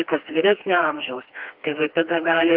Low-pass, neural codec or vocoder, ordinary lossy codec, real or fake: 5.4 kHz; codec, 16 kHz, 2 kbps, FreqCodec, smaller model; Opus, 32 kbps; fake